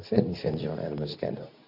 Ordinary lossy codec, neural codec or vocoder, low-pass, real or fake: none; codec, 24 kHz, 0.9 kbps, WavTokenizer, medium speech release version 2; 5.4 kHz; fake